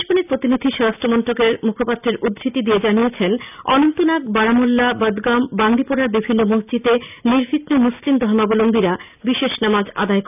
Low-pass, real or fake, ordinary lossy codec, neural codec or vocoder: 3.6 kHz; real; none; none